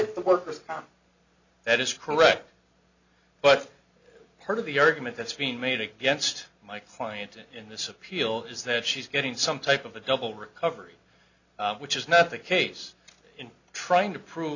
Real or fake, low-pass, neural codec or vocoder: real; 7.2 kHz; none